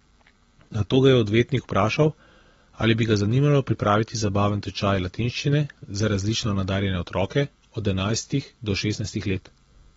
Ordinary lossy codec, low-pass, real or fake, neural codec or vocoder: AAC, 24 kbps; 10.8 kHz; real; none